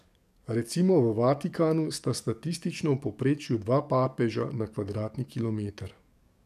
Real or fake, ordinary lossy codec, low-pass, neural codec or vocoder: fake; none; 14.4 kHz; codec, 44.1 kHz, 7.8 kbps, DAC